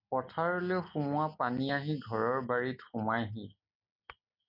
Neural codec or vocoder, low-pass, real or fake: none; 5.4 kHz; real